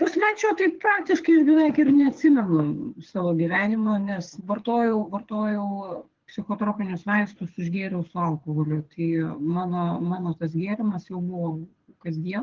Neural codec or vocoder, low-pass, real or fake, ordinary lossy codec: codec, 24 kHz, 6 kbps, HILCodec; 7.2 kHz; fake; Opus, 16 kbps